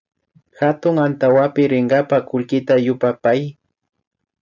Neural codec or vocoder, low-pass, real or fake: none; 7.2 kHz; real